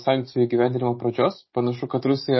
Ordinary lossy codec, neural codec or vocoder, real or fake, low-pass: MP3, 24 kbps; none; real; 7.2 kHz